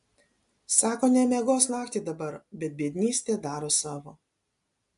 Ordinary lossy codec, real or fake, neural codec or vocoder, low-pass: AAC, 64 kbps; real; none; 10.8 kHz